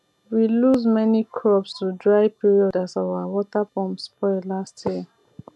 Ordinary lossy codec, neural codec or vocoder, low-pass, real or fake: none; none; none; real